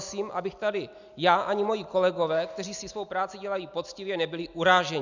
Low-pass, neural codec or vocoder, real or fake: 7.2 kHz; none; real